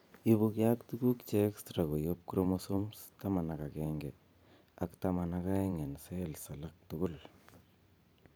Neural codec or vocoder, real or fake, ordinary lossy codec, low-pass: vocoder, 44.1 kHz, 128 mel bands every 512 samples, BigVGAN v2; fake; none; none